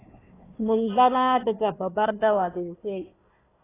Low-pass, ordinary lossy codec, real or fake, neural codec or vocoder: 3.6 kHz; AAC, 16 kbps; fake; codec, 16 kHz, 1 kbps, FunCodec, trained on Chinese and English, 50 frames a second